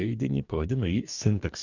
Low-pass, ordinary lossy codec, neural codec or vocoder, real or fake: 7.2 kHz; Opus, 64 kbps; codec, 44.1 kHz, 2.6 kbps, DAC; fake